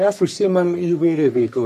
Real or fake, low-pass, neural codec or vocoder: fake; 14.4 kHz; codec, 44.1 kHz, 3.4 kbps, Pupu-Codec